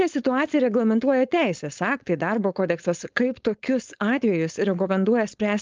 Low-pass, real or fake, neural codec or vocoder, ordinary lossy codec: 7.2 kHz; fake; codec, 16 kHz, 4.8 kbps, FACodec; Opus, 24 kbps